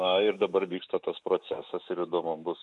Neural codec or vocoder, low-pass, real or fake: autoencoder, 48 kHz, 128 numbers a frame, DAC-VAE, trained on Japanese speech; 10.8 kHz; fake